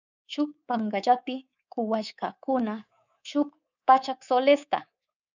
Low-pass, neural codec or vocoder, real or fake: 7.2 kHz; codec, 24 kHz, 3.1 kbps, DualCodec; fake